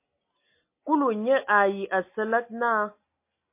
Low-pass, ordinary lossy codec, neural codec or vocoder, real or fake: 3.6 kHz; MP3, 24 kbps; none; real